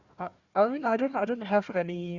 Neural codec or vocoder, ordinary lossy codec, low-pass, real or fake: codec, 16 kHz, 1 kbps, FunCodec, trained on Chinese and English, 50 frames a second; Opus, 64 kbps; 7.2 kHz; fake